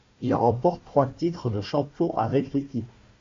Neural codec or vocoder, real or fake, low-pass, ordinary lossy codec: codec, 16 kHz, 1 kbps, FunCodec, trained on Chinese and English, 50 frames a second; fake; 7.2 kHz; MP3, 48 kbps